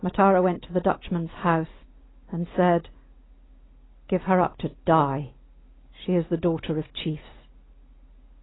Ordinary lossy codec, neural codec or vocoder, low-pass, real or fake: AAC, 16 kbps; none; 7.2 kHz; real